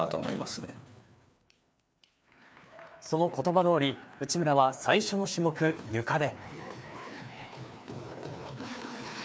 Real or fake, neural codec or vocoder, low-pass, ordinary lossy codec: fake; codec, 16 kHz, 2 kbps, FreqCodec, larger model; none; none